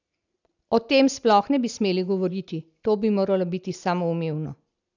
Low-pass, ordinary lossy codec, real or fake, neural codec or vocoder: 7.2 kHz; none; real; none